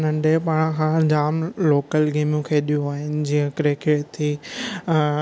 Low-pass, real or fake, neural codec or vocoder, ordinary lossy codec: none; real; none; none